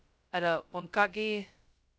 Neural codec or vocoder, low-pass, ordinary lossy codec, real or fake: codec, 16 kHz, 0.2 kbps, FocalCodec; none; none; fake